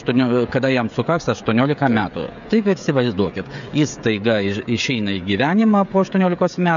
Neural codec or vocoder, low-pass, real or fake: codec, 16 kHz, 16 kbps, FreqCodec, smaller model; 7.2 kHz; fake